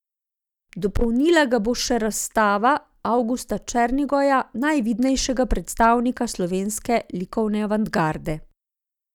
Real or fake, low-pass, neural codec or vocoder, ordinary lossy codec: real; 19.8 kHz; none; none